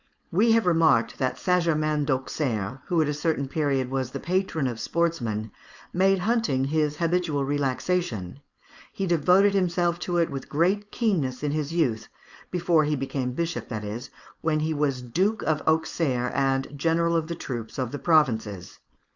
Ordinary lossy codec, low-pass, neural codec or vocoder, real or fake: Opus, 64 kbps; 7.2 kHz; codec, 16 kHz, 4.8 kbps, FACodec; fake